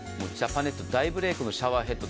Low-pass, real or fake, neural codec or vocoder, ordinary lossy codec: none; real; none; none